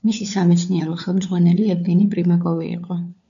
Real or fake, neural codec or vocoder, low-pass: fake; codec, 16 kHz, 4 kbps, FunCodec, trained on LibriTTS, 50 frames a second; 7.2 kHz